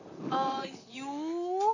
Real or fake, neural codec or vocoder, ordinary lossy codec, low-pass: fake; vocoder, 44.1 kHz, 128 mel bands, Pupu-Vocoder; none; 7.2 kHz